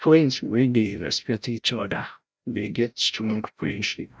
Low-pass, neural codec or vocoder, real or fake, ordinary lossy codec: none; codec, 16 kHz, 0.5 kbps, FreqCodec, larger model; fake; none